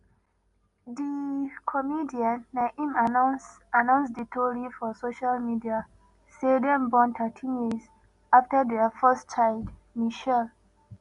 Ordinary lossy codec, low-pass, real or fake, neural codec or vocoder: none; 10.8 kHz; real; none